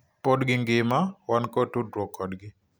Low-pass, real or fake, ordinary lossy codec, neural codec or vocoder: none; real; none; none